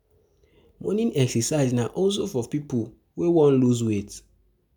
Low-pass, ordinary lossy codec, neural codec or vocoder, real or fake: none; none; none; real